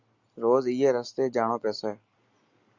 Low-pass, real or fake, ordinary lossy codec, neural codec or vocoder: 7.2 kHz; real; Opus, 64 kbps; none